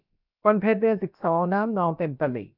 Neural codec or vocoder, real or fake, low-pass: codec, 16 kHz, about 1 kbps, DyCAST, with the encoder's durations; fake; 5.4 kHz